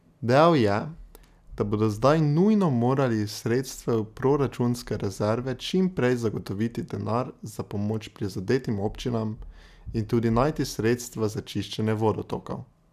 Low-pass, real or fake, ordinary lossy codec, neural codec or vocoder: 14.4 kHz; real; none; none